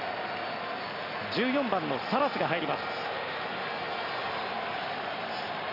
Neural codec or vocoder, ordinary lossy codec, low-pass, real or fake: none; none; 5.4 kHz; real